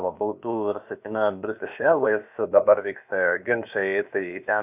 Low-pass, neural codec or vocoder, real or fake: 3.6 kHz; codec, 16 kHz, about 1 kbps, DyCAST, with the encoder's durations; fake